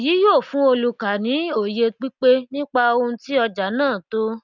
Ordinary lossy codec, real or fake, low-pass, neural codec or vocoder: none; real; 7.2 kHz; none